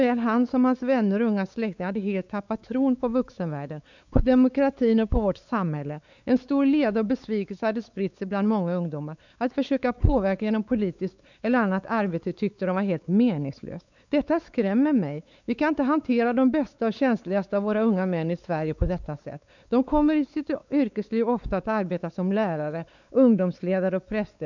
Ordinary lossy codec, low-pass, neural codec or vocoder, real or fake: none; 7.2 kHz; codec, 16 kHz, 4 kbps, X-Codec, WavLM features, trained on Multilingual LibriSpeech; fake